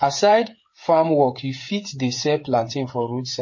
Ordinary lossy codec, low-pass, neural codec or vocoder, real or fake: MP3, 32 kbps; 7.2 kHz; codec, 16 kHz, 8 kbps, FreqCodec, smaller model; fake